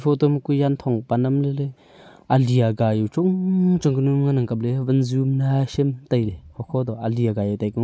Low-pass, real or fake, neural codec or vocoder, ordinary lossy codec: none; real; none; none